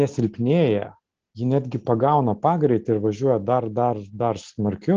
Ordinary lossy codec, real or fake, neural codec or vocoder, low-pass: Opus, 16 kbps; real; none; 7.2 kHz